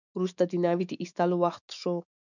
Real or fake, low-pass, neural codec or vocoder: fake; 7.2 kHz; autoencoder, 48 kHz, 128 numbers a frame, DAC-VAE, trained on Japanese speech